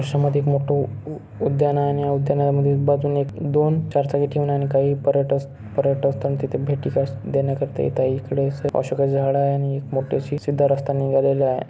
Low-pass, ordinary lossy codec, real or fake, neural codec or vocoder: none; none; real; none